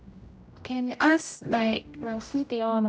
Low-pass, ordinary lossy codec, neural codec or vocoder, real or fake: none; none; codec, 16 kHz, 0.5 kbps, X-Codec, HuBERT features, trained on general audio; fake